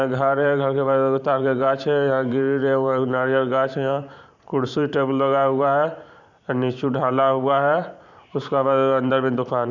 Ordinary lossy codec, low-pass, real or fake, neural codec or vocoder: none; 7.2 kHz; fake; vocoder, 44.1 kHz, 128 mel bands every 512 samples, BigVGAN v2